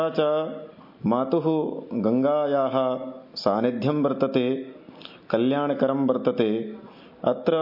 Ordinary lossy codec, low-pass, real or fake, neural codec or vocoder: MP3, 32 kbps; 5.4 kHz; real; none